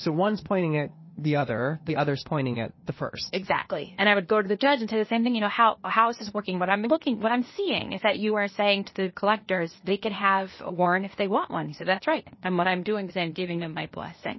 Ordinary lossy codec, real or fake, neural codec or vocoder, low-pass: MP3, 24 kbps; fake; codec, 16 kHz, 0.8 kbps, ZipCodec; 7.2 kHz